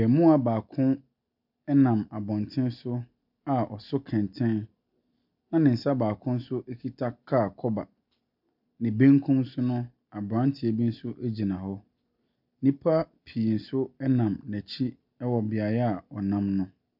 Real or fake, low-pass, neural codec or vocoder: real; 5.4 kHz; none